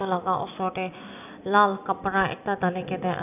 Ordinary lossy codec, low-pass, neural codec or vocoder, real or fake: none; 3.6 kHz; none; real